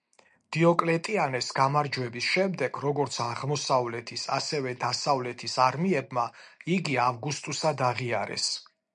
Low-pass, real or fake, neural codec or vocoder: 9.9 kHz; real; none